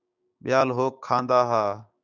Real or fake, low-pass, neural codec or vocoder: fake; 7.2 kHz; codec, 16 kHz, 6 kbps, DAC